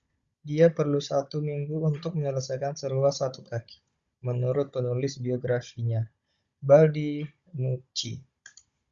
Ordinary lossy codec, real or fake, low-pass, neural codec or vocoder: Opus, 64 kbps; fake; 7.2 kHz; codec, 16 kHz, 16 kbps, FunCodec, trained on Chinese and English, 50 frames a second